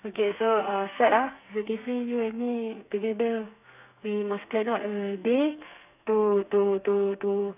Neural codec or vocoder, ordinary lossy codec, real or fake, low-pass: codec, 32 kHz, 1.9 kbps, SNAC; none; fake; 3.6 kHz